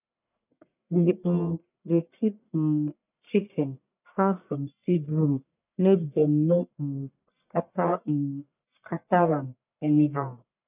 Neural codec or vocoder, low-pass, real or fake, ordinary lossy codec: codec, 44.1 kHz, 1.7 kbps, Pupu-Codec; 3.6 kHz; fake; none